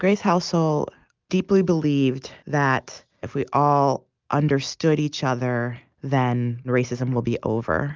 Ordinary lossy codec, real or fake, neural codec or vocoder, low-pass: Opus, 24 kbps; real; none; 7.2 kHz